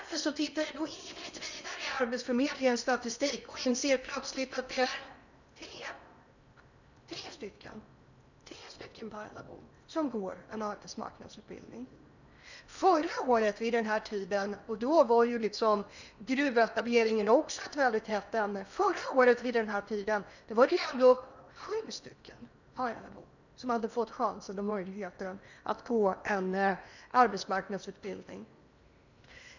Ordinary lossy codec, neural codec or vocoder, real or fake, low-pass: none; codec, 16 kHz in and 24 kHz out, 0.8 kbps, FocalCodec, streaming, 65536 codes; fake; 7.2 kHz